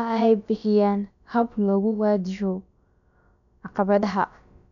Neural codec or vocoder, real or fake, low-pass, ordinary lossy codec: codec, 16 kHz, about 1 kbps, DyCAST, with the encoder's durations; fake; 7.2 kHz; none